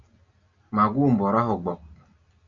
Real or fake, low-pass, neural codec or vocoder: real; 7.2 kHz; none